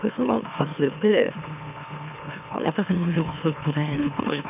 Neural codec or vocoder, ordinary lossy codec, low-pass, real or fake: autoencoder, 44.1 kHz, a latent of 192 numbers a frame, MeloTTS; none; 3.6 kHz; fake